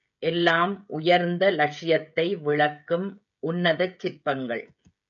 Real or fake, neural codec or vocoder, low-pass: fake; codec, 16 kHz, 16 kbps, FreqCodec, smaller model; 7.2 kHz